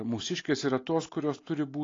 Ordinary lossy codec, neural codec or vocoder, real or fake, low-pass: AAC, 32 kbps; none; real; 7.2 kHz